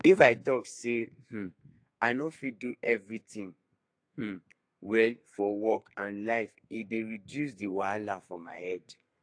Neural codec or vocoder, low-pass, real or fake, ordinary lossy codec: codec, 32 kHz, 1.9 kbps, SNAC; 9.9 kHz; fake; AAC, 48 kbps